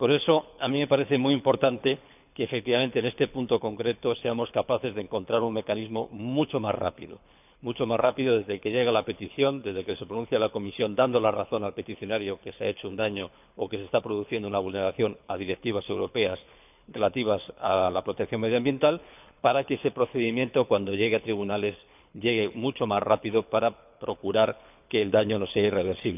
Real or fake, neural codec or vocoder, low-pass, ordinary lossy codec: fake; codec, 24 kHz, 6 kbps, HILCodec; 3.6 kHz; none